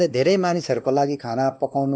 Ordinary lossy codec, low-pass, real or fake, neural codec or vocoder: none; none; fake; codec, 16 kHz, 2 kbps, X-Codec, WavLM features, trained on Multilingual LibriSpeech